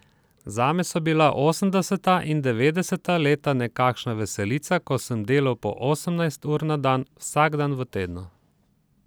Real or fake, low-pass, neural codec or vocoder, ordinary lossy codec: real; none; none; none